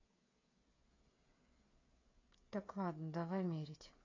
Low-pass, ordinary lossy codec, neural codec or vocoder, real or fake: 7.2 kHz; AAC, 32 kbps; codec, 16 kHz, 8 kbps, FreqCodec, smaller model; fake